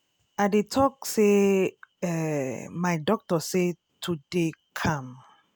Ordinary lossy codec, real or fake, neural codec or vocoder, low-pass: none; real; none; none